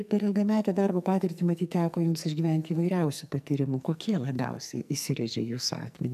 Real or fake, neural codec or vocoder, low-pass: fake; codec, 44.1 kHz, 2.6 kbps, SNAC; 14.4 kHz